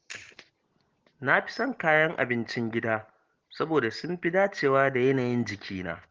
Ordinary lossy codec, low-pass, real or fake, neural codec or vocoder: Opus, 16 kbps; 7.2 kHz; real; none